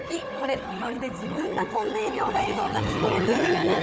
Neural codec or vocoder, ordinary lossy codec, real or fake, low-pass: codec, 16 kHz, 4 kbps, FunCodec, trained on Chinese and English, 50 frames a second; none; fake; none